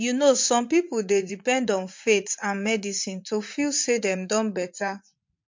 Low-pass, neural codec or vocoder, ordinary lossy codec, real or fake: 7.2 kHz; vocoder, 44.1 kHz, 80 mel bands, Vocos; MP3, 48 kbps; fake